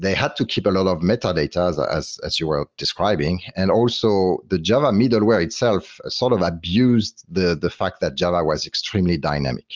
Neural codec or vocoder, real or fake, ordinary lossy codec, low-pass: none; real; Opus, 24 kbps; 7.2 kHz